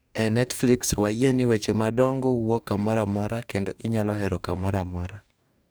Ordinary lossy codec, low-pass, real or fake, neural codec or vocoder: none; none; fake; codec, 44.1 kHz, 2.6 kbps, DAC